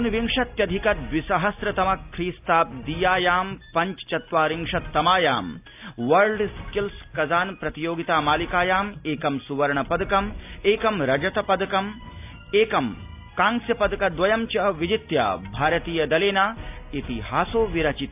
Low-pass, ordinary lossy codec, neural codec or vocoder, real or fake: 3.6 kHz; AAC, 32 kbps; none; real